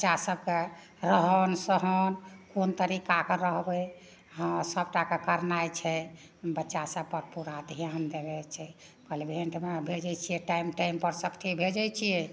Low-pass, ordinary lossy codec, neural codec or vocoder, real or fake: none; none; none; real